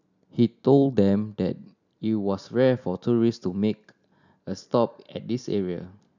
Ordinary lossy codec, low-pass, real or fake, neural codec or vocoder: none; 7.2 kHz; real; none